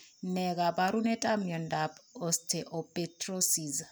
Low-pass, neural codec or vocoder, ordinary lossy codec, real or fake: none; none; none; real